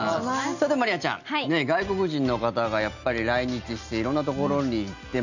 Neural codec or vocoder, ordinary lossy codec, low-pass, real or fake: none; none; 7.2 kHz; real